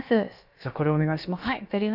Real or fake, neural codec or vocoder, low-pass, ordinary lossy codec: fake; codec, 16 kHz, about 1 kbps, DyCAST, with the encoder's durations; 5.4 kHz; none